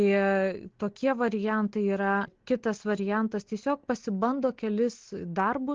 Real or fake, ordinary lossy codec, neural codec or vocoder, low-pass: real; Opus, 16 kbps; none; 7.2 kHz